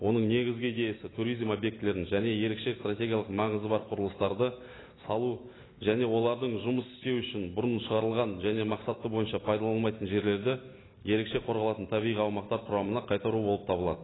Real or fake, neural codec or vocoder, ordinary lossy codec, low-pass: real; none; AAC, 16 kbps; 7.2 kHz